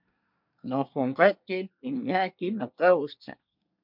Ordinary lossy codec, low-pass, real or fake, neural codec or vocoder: MP3, 48 kbps; 5.4 kHz; fake; codec, 24 kHz, 1 kbps, SNAC